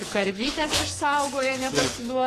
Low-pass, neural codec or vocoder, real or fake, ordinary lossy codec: 14.4 kHz; codec, 44.1 kHz, 2.6 kbps, SNAC; fake; AAC, 48 kbps